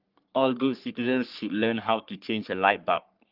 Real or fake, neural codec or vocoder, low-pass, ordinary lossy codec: fake; codec, 44.1 kHz, 3.4 kbps, Pupu-Codec; 5.4 kHz; Opus, 32 kbps